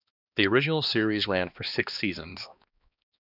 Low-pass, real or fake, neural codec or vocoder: 5.4 kHz; fake; codec, 16 kHz, 2 kbps, X-Codec, HuBERT features, trained on balanced general audio